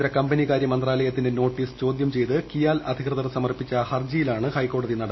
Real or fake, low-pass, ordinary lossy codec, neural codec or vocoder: real; 7.2 kHz; MP3, 24 kbps; none